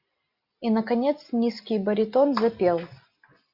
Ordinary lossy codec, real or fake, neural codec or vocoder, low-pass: Opus, 64 kbps; real; none; 5.4 kHz